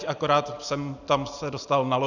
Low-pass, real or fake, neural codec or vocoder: 7.2 kHz; real; none